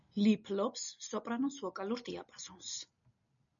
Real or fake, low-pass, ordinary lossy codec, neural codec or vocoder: real; 7.2 kHz; MP3, 96 kbps; none